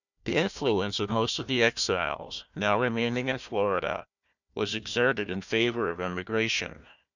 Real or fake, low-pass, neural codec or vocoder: fake; 7.2 kHz; codec, 16 kHz, 1 kbps, FunCodec, trained on Chinese and English, 50 frames a second